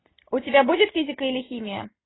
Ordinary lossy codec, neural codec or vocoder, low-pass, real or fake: AAC, 16 kbps; none; 7.2 kHz; real